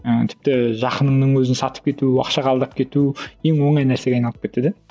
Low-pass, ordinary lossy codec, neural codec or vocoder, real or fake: none; none; none; real